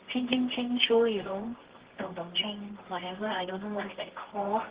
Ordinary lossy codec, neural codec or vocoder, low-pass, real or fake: Opus, 16 kbps; codec, 24 kHz, 0.9 kbps, WavTokenizer, medium music audio release; 3.6 kHz; fake